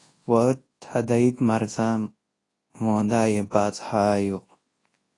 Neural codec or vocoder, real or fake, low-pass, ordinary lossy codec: codec, 24 kHz, 0.9 kbps, WavTokenizer, large speech release; fake; 10.8 kHz; AAC, 32 kbps